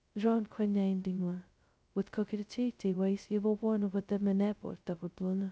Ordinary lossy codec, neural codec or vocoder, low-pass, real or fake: none; codec, 16 kHz, 0.2 kbps, FocalCodec; none; fake